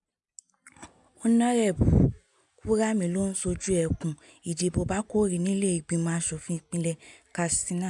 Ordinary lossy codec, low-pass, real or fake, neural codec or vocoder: none; 10.8 kHz; real; none